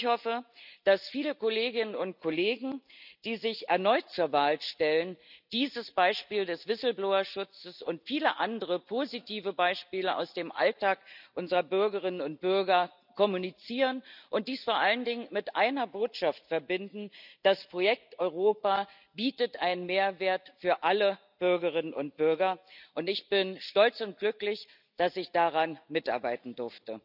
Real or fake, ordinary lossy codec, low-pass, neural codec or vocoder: real; none; 5.4 kHz; none